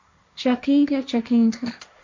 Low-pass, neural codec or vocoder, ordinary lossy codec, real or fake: 7.2 kHz; codec, 16 kHz, 1.1 kbps, Voila-Tokenizer; MP3, 64 kbps; fake